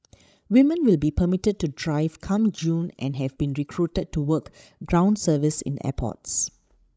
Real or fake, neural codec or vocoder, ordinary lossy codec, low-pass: fake; codec, 16 kHz, 16 kbps, FreqCodec, larger model; none; none